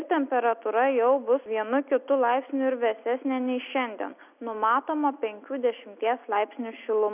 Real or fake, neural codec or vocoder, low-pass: real; none; 3.6 kHz